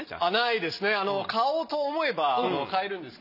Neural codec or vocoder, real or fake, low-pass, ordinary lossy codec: none; real; 5.4 kHz; none